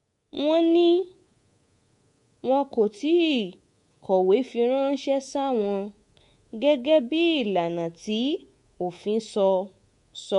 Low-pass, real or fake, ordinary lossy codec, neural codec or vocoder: 10.8 kHz; fake; MP3, 64 kbps; codec, 24 kHz, 3.1 kbps, DualCodec